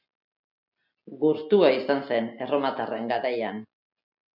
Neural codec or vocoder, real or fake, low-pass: none; real; 5.4 kHz